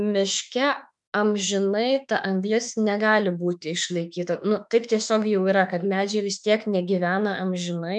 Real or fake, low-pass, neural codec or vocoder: fake; 10.8 kHz; autoencoder, 48 kHz, 32 numbers a frame, DAC-VAE, trained on Japanese speech